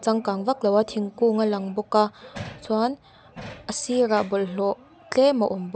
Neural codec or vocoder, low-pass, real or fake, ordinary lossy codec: none; none; real; none